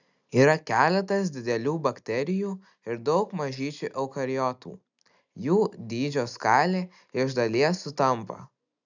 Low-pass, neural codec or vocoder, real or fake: 7.2 kHz; none; real